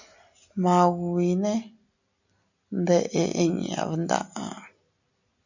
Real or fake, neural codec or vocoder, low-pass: real; none; 7.2 kHz